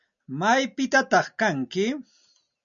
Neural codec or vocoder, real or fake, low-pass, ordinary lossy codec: none; real; 7.2 kHz; MP3, 96 kbps